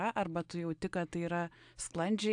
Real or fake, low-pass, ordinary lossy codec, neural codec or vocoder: real; 9.9 kHz; AAC, 64 kbps; none